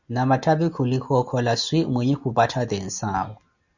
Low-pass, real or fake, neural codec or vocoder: 7.2 kHz; real; none